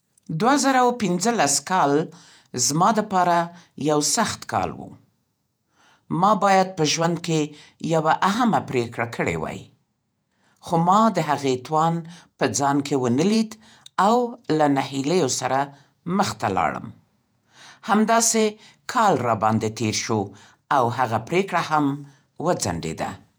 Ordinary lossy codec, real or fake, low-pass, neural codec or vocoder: none; fake; none; vocoder, 48 kHz, 128 mel bands, Vocos